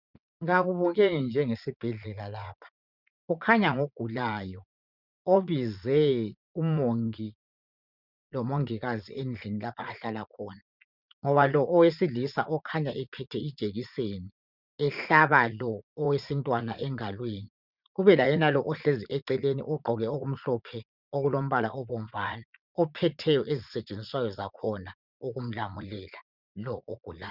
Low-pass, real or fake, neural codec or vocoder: 5.4 kHz; fake; vocoder, 44.1 kHz, 80 mel bands, Vocos